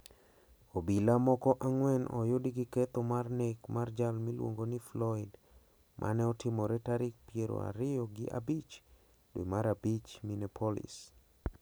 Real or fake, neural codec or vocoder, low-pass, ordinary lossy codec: real; none; none; none